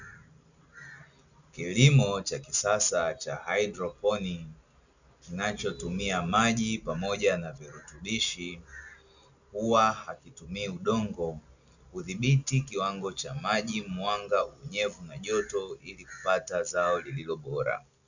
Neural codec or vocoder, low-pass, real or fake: none; 7.2 kHz; real